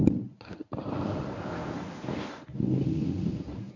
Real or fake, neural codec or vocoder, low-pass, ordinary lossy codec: fake; codec, 24 kHz, 0.9 kbps, WavTokenizer, medium speech release version 1; 7.2 kHz; none